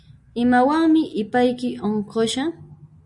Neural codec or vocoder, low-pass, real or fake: none; 10.8 kHz; real